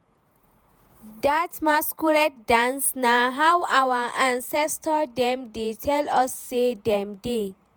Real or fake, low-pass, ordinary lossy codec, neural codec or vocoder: fake; none; none; vocoder, 48 kHz, 128 mel bands, Vocos